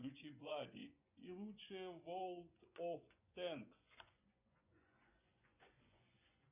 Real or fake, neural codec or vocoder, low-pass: fake; vocoder, 24 kHz, 100 mel bands, Vocos; 3.6 kHz